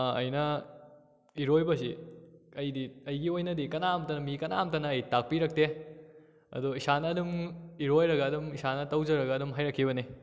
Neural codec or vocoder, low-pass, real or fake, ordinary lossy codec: none; none; real; none